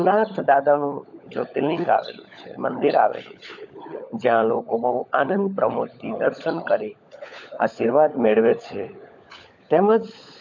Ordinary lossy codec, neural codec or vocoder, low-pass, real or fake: none; codec, 16 kHz, 16 kbps, FunCodec, trained on LibriTTS, 50 frames a second; 7.2 kHz; fake